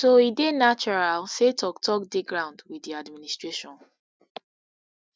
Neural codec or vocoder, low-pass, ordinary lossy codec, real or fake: none; none; none; real